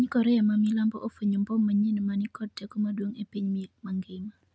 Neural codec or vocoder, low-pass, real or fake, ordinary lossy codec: none; none; real; none